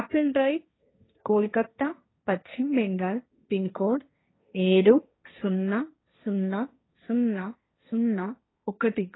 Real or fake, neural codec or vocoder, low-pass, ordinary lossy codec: fake; codec, 16 kHz, 1.1 kbps, Voila-Tokenizer; 7.2 kHz; AAC, 16 kbps